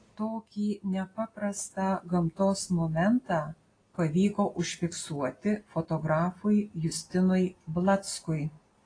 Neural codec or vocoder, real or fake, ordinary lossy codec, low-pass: none; real; AAC, 32 kbps; 9.9 kHz